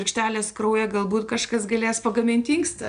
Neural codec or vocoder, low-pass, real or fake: none; 9.9 kHz; real